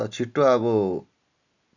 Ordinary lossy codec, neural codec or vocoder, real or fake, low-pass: none; none; real; 7.2 kHz